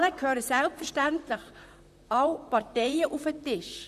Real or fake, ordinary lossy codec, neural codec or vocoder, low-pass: fake; none; vocoder, 44.1 kHz, 128 mel bands, Pupu-Vocoder; 14.4 kHz